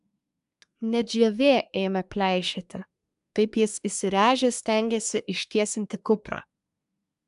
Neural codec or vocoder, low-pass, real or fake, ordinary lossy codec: codec, 24 kHz, 1 kbps, SNAC; 10.8 kHz; fake; AAC, 96 kbps